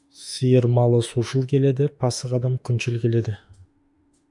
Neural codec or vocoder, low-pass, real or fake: autoencoder, 48 kHz, 32 numbers a frame, DAC-VAE, trained on Japanese speech; 10.8 kHz; fake